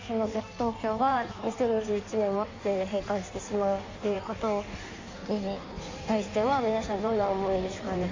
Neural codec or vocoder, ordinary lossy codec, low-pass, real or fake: codec, 16 kHz in and 24 kHz out, 1.1 kbps, FireRedTTS-2 codec; MP3, 64 kbps; 7.2 kHz; fake